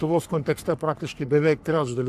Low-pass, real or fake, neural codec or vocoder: 14.4 kHz; fake; codec, 44.1 kHz, 3.4 kbps, Pupu-Codec